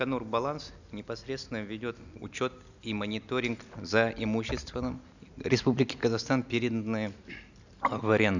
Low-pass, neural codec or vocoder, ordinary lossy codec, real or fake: 7.2 kHz; none; none; real